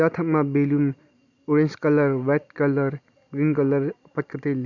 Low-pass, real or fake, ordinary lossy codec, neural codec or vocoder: 7.2 kHz; real; none; none